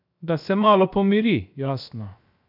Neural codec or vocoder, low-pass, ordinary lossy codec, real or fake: codec, 16 kHz, 0.7 kbps, FocalCodec; 5.4 kHz; none; fake